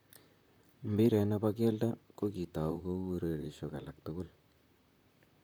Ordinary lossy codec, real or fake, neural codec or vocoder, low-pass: none; fake; vocoder, 44.1 kHz, 128 mel bands, Pupu-Vocoder; none